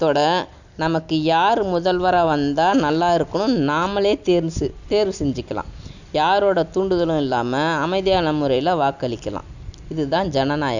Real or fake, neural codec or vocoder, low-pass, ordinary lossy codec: real; none; 7.2 kHz; none